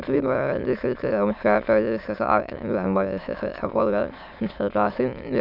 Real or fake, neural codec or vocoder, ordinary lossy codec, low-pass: fake; autoencoder, 22.05 kHz, a latent of 192 numbers a frame, VITS, trained on many speakers; none; 5.4 kHz